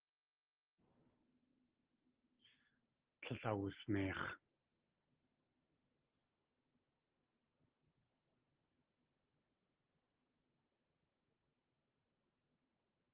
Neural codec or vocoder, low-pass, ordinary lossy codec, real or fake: none; 3.6 kHz; Opus, 16 kbps; real